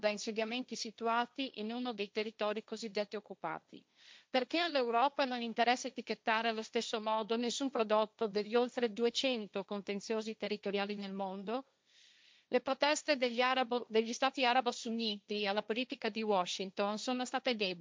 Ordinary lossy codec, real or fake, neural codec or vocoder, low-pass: none; fake; codec, 16 kHz, 1.1 kbps, Voila-Tokenizer; none